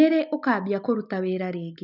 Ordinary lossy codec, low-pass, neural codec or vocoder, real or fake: none; 5.4 kHz; none; real